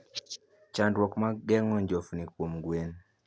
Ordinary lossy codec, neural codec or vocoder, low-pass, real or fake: none; none; none; real